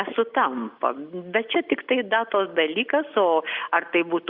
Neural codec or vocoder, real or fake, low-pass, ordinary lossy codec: none; real; 5.4 kHz; Opus, 64 kbps